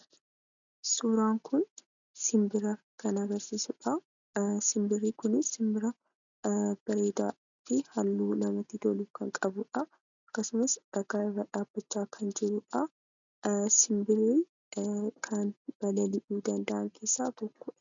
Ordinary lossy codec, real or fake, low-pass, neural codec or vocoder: MP3, 96 kbps; real; 7.2 kHz; none